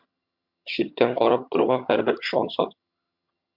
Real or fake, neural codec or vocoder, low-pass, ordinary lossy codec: fake; vocoder, 22.05 kHz, 80 mel bands, HiFi-GAN; 5.4 kHz; MP3, 48 kbps